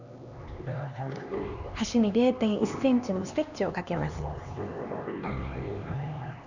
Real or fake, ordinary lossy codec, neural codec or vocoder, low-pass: fake; none; codec, 16 kHz, 2 kbps, X-Codec, HuBERT features, trained on LibriSpeech; 7.2 kHz